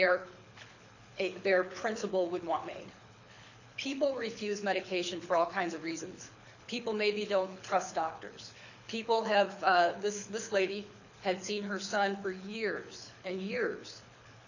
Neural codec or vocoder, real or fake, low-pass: codec, 24 kHz, 6 kbps, HILCodec; fake; 7.2 kHz